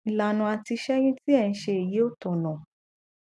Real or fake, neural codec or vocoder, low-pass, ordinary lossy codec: real; none; none; none